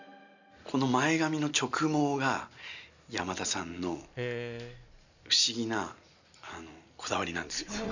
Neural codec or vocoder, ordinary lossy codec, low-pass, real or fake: none; none; 7.2 kHz; real